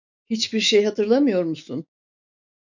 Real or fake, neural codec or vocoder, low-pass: fake; autoencoder, 48 kHz, 128 numbers a frame, DAC-VAE, trained on Japanese speech; 7.2 kHz